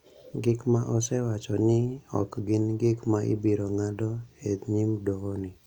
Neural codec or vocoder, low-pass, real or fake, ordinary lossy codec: none; 19.8 kHz; real; none